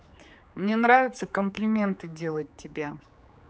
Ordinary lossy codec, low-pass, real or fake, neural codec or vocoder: none; none; fake; codec, 16 kHz, 4 kbps, X-Codec, HuBERT features, trained on general audio